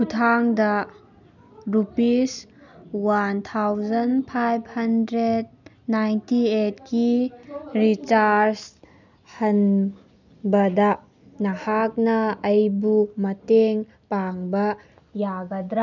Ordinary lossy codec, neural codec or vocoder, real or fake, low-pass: none; none; real; 7.2 kHz